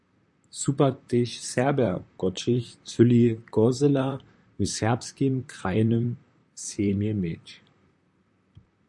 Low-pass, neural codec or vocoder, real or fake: 10.8 kHz; vocoder, 44.1 kHz, 128 mel bands, Pupu-Vocoder; fake